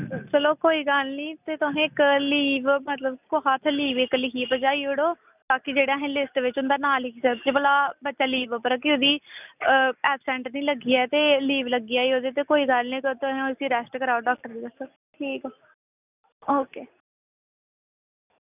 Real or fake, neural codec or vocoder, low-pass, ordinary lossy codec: real; none; 3.6 kHz; none